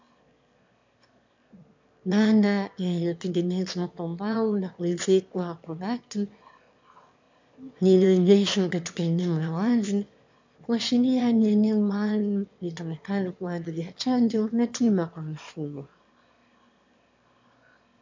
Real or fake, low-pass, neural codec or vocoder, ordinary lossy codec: fake; 7.2 kHz; autoencoder, 22.05 kHz, a latent of 192 numbers a frame, VITS, trained on one speaker; MP3, 64 kbps